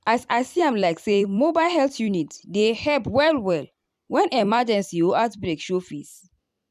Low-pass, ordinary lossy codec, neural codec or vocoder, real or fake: 14.4 kHz; none; vocoder, 44.1 kHz, 128 mel bands every 256 samples, BigVGAN v2; fake